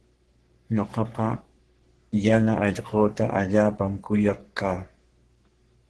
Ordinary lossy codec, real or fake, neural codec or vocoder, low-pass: Opus, 16 kbps; fake; codec, 44.1 kHz, 3.4 kbps, Pupu-Codec; 10.8 kHz